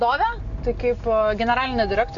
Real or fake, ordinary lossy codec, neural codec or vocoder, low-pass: real; AAC, 64 kbps; none; 7.2 kHz